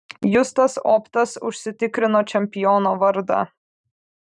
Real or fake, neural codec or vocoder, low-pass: fake; vocoder, 44.1 kHz, 128 mel bands every 512 samples, BigVGAN v2; 10.8 kHz